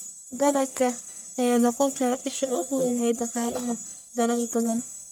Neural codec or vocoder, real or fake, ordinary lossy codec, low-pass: codec, 44.1 kHz, 1.7 kbps, Pupu-Codec; fake; none; none